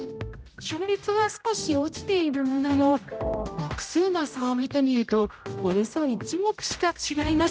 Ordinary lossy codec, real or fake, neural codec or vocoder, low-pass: none; fake; codec, 16 kHz, 0.5 kbps, X-Codec, HuBERT features, trained on general audio; none